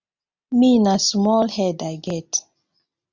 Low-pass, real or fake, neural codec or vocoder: 7.2 kHz; real; none